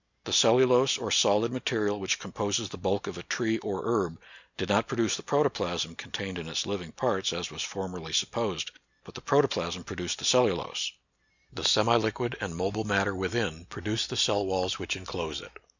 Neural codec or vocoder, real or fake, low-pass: none; real; 7.2 kHz